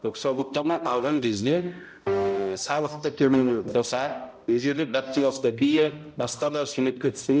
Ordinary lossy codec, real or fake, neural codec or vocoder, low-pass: none; fake; codec, 16 kHz, 0.5 kbps, X-Codec, HuBERT features, trained on balanced general audio; none